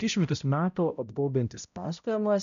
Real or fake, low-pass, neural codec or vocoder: fake; 7.2 kHz; codec, 16 kHz, 0.5 kbps, X-Codec, HuBERT features, trained on balanced general audio